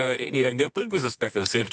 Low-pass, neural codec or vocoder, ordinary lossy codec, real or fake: 10.8 kHz; codec, 24 kHz, 0.9 kbps, WavTokenizer, medium music audio release; MP3, 96 kbps; fake